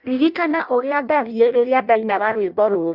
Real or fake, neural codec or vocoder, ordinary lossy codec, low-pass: fake; codec, 16 kHz in and 24 kHz out, 0.6 kbps, FireRedTTS-2 codec; Opus, 64 kbps; 5.4 kHz